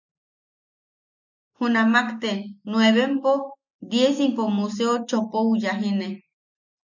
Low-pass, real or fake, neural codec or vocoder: 7.2 kHz; real; none